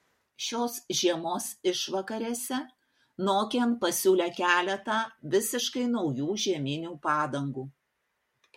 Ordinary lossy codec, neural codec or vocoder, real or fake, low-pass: MP3, 64 kbps; none; real; 19.8 kHz